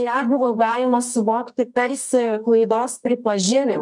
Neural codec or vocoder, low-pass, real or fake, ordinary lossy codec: codec, 24 kHz, 0.9 kbps, WavTokenizer, medium music audio release; 10.8 kHz; fake; MP3, 96 kbps